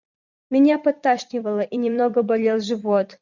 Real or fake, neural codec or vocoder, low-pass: fake; vocoder, 44.1 kHz, 128 mel bands every 256 samples, BigVGAN v2; 7.2 kHz